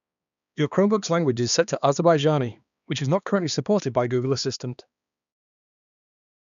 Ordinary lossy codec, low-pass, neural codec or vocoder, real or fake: none; 7.2 kHz; codec, 16 kHz, 2 kbps, X-Codec, HuBERT features, trained on balanced general audio; fake